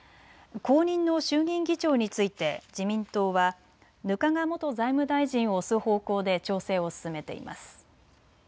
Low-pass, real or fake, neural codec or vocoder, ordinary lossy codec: none; real; none; none